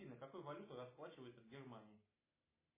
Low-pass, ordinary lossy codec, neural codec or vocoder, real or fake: 3.6 kHz; MP3, 16 kbps; none; real